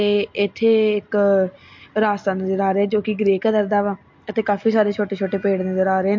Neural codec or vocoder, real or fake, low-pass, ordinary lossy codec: none; real; 7.2 kHz; MP3, 48 kbps